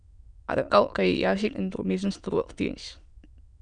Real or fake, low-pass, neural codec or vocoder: fake; 9.9 kHz; autoencoder, 22.05 kHz, a latent of 192 numbers a frame, VITS, trained on many speakers